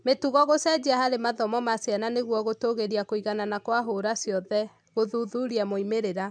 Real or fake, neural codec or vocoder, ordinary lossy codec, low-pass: real; none; MP3, 96 kbps; 9.9 kHz